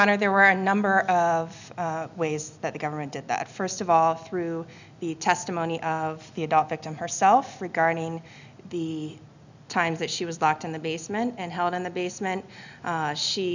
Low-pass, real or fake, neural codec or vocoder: 7.2 kHz; real; none